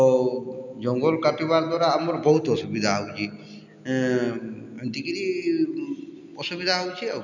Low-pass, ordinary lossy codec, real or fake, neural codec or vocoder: 7.2 kHz; none; real; none